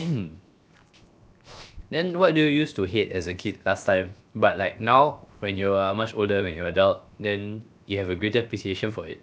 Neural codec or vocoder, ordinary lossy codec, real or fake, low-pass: codec, 16 kHz, 0.7 kbps, FocalCodec; none; fake; none